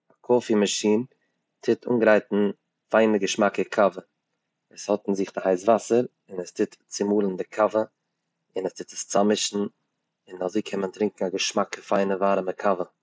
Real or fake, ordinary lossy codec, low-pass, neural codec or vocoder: real; none; none; none